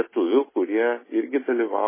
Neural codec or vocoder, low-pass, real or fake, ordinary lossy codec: none; 3.6 kHz; real; MP3, 16 kbps